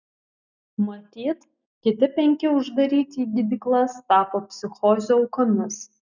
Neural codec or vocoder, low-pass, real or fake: none; 7.2 kHz; real